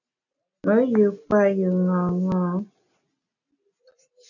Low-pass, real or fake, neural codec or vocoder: 7.2 kHz; real; none